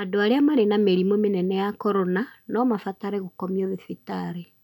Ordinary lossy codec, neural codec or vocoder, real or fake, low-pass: none; none; real; 19.8 kHz